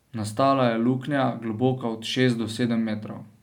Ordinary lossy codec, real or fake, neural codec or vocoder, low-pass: none; real; none; 19.8 kHz